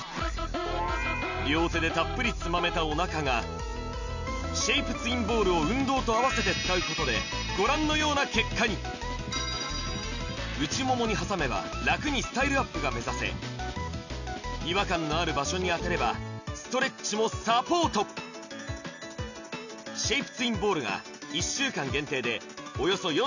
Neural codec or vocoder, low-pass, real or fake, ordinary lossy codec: none; 7.2 kHz; real; none